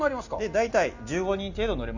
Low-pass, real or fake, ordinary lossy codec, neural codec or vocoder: 7.2 kHz; real; none; none